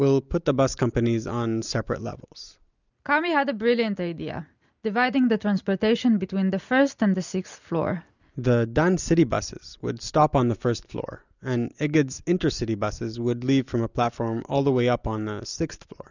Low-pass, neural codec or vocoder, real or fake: 7.2 kHz; none; real